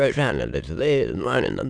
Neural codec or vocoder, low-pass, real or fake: autoencoder, 22.05 kHz, a latent of 192 numbers a frame, VITS, trained on many speakers; 9.9 kHz; fake